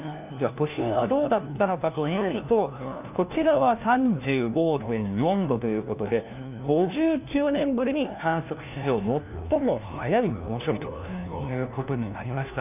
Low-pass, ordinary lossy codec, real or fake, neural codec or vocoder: 3.6 kHz; none; fake; codec, 16 kHz, 1 kbps, FunCodec, trained on LibriTTS, 50 frames a second